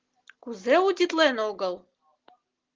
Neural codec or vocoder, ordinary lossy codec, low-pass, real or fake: none; Opus, 16 kbps; 7.2 kHz; real